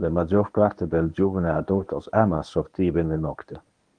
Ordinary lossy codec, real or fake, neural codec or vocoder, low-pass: Opus, 24 kbps; fake; codec, 24 kHz, 0.9 kbps, WavTokenizer, medium speech release version 1; 9.9 kHz